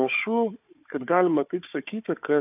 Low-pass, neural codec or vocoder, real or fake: 3.6 kHz; codec, 16 kHz, 4 kbps, FunCodec, trained on Chinese and English, 50 frames a second; fake